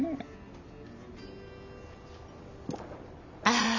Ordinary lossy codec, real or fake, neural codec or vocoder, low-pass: none; real; none; 7.2 kHz